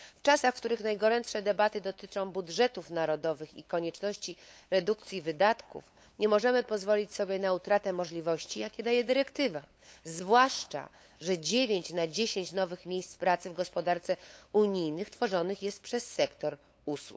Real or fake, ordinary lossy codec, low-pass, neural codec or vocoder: fake; none; none; codec, 16 kHz, 8 kbps, FunCodec, trained on LibriTTS, 25 frames a second